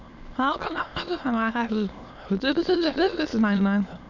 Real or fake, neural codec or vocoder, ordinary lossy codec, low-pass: fake; autoencoder, 22.05 kHz, a latent of 192 numbers a frame, VITS, trained on many speakers; none; 7.2 kHz